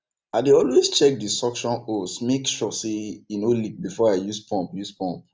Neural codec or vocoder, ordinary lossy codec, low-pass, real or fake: none; none; none; real